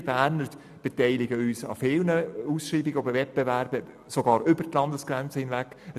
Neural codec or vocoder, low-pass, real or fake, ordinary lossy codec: none; 14.4 kHz; real; none